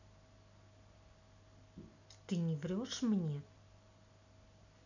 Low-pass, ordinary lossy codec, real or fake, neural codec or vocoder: 7.2 kHz; none; real; none